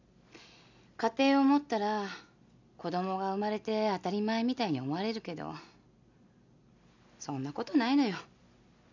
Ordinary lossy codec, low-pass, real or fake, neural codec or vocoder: none; 7.2 kHz; real; none